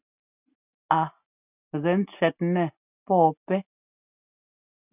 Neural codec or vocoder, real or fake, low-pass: none; real; 3.6 kHz